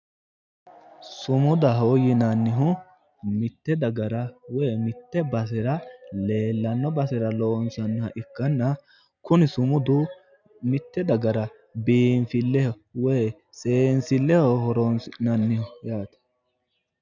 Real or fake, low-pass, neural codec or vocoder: real; 7.2 kHz; none